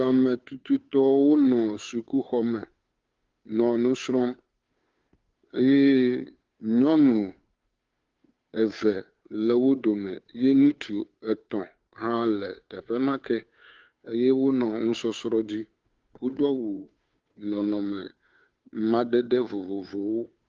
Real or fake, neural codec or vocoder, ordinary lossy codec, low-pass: fake; codec, 16 kHz, 2 kbps, FunCodec, trained on Chinese and English, 25 frames a second; Opus, 32 kbps; 7.2 kHz